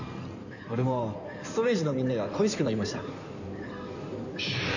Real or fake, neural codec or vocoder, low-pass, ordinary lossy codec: fake; codec, 16 kHz in and 24 kHz out, 2.2 kbps, FireRedTTS-2 codec; 7.2 kHz; none